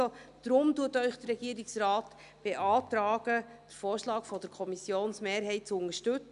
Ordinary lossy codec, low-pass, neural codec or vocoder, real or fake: none; 10.8 kHz; none; real